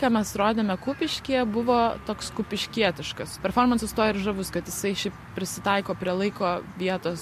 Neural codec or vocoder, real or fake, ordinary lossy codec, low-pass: none; real; MP3, 64 kbps; 14.4 kHz